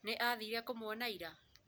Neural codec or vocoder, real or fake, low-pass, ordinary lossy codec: none; real; none; none